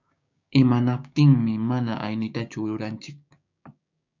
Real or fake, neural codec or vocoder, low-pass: fake; codec, 16 kHz, 6 kbps, DAC; 7.2 kHz